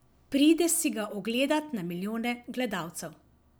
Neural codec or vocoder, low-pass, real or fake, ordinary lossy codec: none; none; real; none